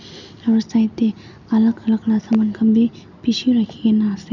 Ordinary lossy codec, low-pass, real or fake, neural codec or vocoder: none; 7.2 kHz; real; none